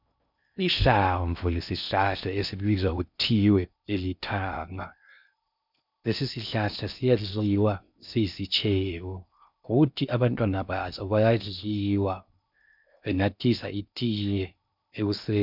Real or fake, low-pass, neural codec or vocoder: fake; 5.4 kHz; codec, 16 kHz in and 24 kHz out, 0.6 kbps, FocalCodec, streaming, 2048 codes